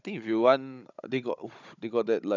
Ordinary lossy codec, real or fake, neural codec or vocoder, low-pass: none; fake; codec, 16 kHz, 16 kbps, FunCodec, trained on Chinese and English, 50 frames a second; 7.2 kHz